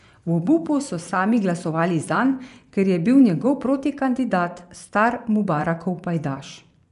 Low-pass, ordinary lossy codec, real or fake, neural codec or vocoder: 10.8 kHz; none; fake; vocoder, 24 kHz, 100 mel bands, Vocos